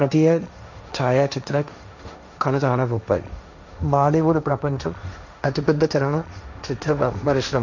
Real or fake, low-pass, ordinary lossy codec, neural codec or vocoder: fake; 7.2 kHz; none; codec, 16 kHz, 1.1 kbps, Voila-Tokenizer